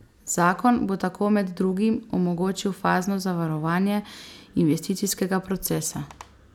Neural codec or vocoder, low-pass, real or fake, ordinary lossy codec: none; 19.8 kHz; real; none